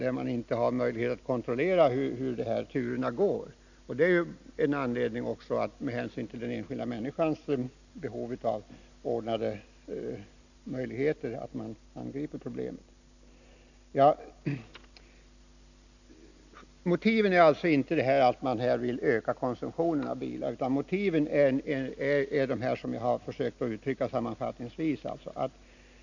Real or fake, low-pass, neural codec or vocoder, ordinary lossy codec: real; 7.2 kHz; none; none